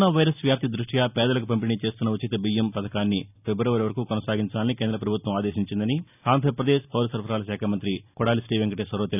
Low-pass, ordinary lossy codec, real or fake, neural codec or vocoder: 3.6 kHz; none; real; none